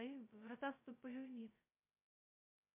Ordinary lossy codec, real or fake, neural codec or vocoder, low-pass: MP3, 24 kbps; fake; codec, 16 kHz, 0.2 kbps, FocalCodec; 3.6 kHz